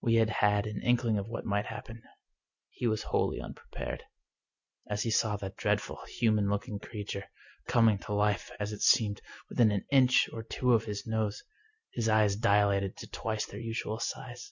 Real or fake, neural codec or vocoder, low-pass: real; none; 7.2 kHz